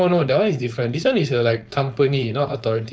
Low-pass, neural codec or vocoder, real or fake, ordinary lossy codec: none; codec, 16 kHz, 4.8 kbps, FACodec; fake; none